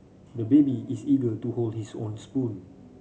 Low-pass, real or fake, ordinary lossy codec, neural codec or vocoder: none; real; none; none